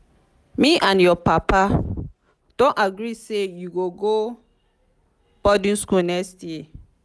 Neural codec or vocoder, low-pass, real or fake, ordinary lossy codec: none; none; real; none